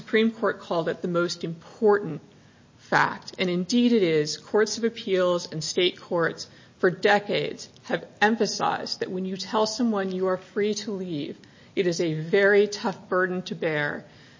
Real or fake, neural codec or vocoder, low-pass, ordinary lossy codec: real; none; 7.2 kHz; MP3, 32 kbps